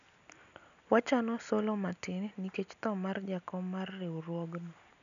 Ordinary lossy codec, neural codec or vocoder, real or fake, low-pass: none; none; real; 7.2 kHz